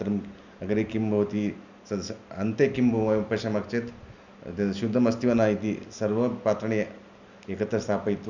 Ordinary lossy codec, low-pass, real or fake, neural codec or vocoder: none; 7.2 kHz; real; none